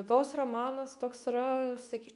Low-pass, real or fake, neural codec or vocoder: 10.8 kHz; fake; codec, 24 kHz, 0.9 kbps, WavTokenizer, medium speech release version 2